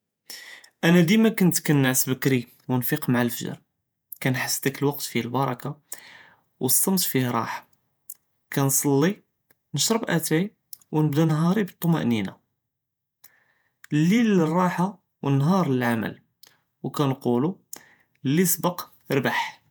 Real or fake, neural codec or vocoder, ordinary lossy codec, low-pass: fake; vocoder, 48 kHz, 128 mel bands, Vocos; none; none